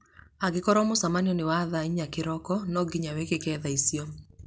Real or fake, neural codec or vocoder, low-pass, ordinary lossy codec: real; none; none; none